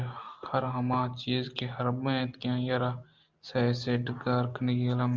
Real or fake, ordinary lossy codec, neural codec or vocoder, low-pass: real; Opus, 32 kbps; none; 7.2 kHz